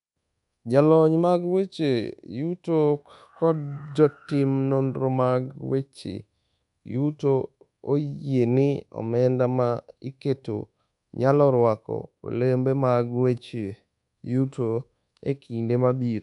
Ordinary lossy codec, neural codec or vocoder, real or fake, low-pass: none; codec, 24 kHz, 1.2 kbps, DualCodec; fake; 10.8 kHz